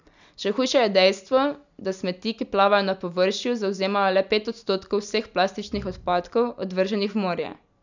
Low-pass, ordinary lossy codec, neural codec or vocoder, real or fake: 7.2 kHz; none; none; real